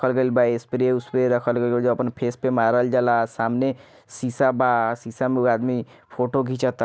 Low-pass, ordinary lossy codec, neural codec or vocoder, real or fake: none; none; none; real